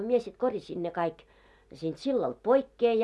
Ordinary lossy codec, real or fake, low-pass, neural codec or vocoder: none; real; none; none